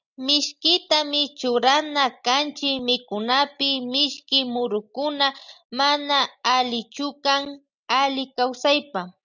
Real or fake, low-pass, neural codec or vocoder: real; 7.2 kHz; none